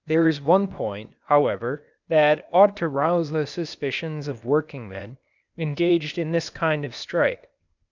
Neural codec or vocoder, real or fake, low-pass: codec, 16 kHz, 0.8 kbps, ZipCodec; fake; 7.2 kHz